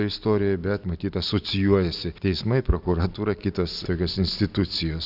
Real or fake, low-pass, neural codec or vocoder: real; 5.4 kHz; none